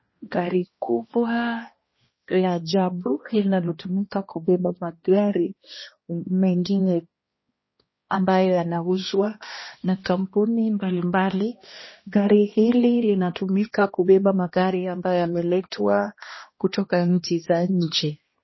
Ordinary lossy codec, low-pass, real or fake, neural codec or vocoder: MP3, 24 kbps; 7.2 kHz; fake; codec, 16 kHz, 1 kbps, X-Codec, HuBERT features, trained on balanced general audio